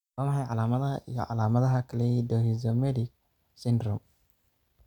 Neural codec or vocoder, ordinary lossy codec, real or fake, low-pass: none; none; real; 19.8 kHz